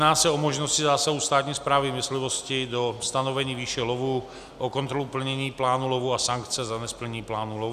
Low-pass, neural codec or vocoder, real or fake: 14.4 kHz; none; real